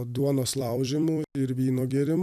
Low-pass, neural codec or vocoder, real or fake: 14.4 kHz; vocoder, 44.1 kHz, 128 mel bands every 256 samples, BigVGAN v2; fake